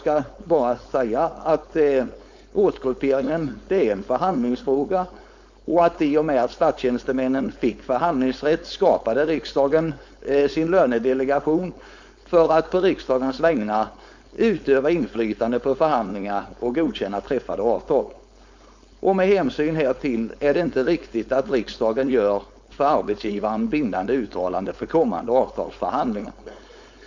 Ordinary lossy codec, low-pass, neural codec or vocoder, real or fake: MP3, 64 kbps; 7.2 kHz; codec, 16 kHz, 4.8 kbps, FACodec; fake